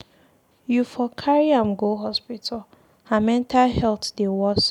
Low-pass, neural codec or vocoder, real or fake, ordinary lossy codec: 19.8 kHz; none; real; none